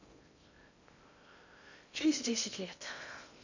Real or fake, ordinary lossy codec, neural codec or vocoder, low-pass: fake; none; codec, 16 kHz in and 24 kHz out, 0.6 kbps, FocalCodec, streaming, 2048 codes; 7.2 kHz